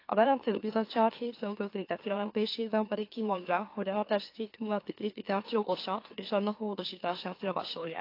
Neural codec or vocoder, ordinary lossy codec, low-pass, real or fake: autoencoder, 44.1 kHz, a latent of 192 numbers a frame, MeloTTS; AAC, 32 kbps; 5.4 kHz; fake